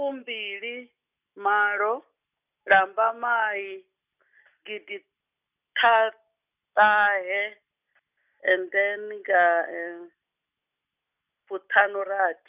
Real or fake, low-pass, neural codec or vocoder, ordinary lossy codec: real; 3.6 kHz; none; none